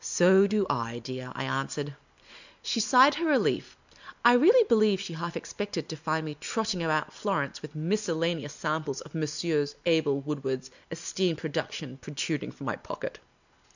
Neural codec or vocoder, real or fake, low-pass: none; real; 7.2 kHz